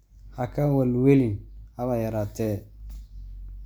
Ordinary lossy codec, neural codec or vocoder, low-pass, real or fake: none; none; none; real